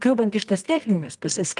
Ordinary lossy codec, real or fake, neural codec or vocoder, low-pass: Opus, 32 kbps; fake; codec, 24 kHz, 0.9 kbps, WavTokenizer, medium music audio release; 10.8 kHz